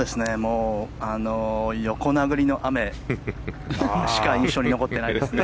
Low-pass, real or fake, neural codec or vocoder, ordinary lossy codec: none; real; none; none